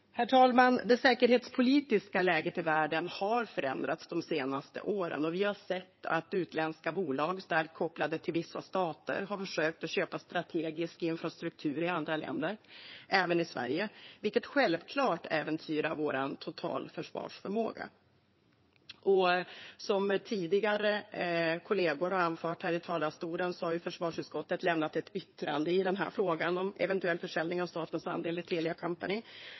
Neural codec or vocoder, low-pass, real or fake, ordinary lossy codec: codec, 16 kHz in and 24 kHz out, 2.2 kbps, FireRedTTS-2 codec; 7.2 kHz; fake; MP3, 24 kbps